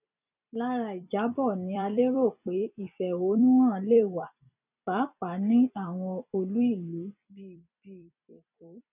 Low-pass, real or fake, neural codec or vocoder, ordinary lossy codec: 3.6 kHz; fake; vocoder, 44.1 kHz, 128 mel bands every 256 samples, BigVGAN v2; none